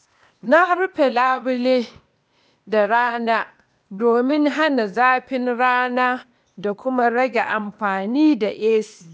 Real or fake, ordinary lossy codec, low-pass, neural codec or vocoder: fake; none; none; codec, 16 kHz, 0.8 kbps, ZipCodec